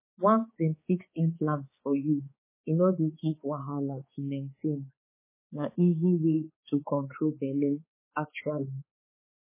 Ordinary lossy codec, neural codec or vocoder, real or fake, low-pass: MP3, 16 kbps; codec, 16 kHz, 4 kbps, X-Codec, HuBERT features, trained on balanced general audio; fake; 3.6 kHz